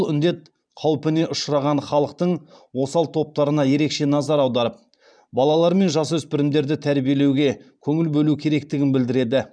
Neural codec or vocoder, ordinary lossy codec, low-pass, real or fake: vocoder, 44.1 kHz, 128 mel bands every 512 samples, BigVGAN v2; none; 9.9 kHz; fake